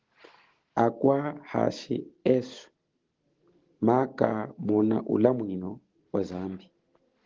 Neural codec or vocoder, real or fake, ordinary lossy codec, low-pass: none; real; Opus, 16 kbps; 7.2 kHz